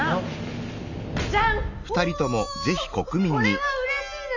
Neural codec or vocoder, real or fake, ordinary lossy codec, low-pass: none; real; none; 7.2 kHz